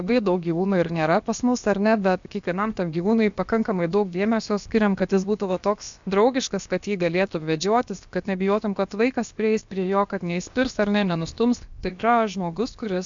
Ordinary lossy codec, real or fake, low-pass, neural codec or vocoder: MP3, 64 kbps; fake; 7.2 kHz; codec, 16 kHz, about 1 kbps, DyCAST, with the encoder's durations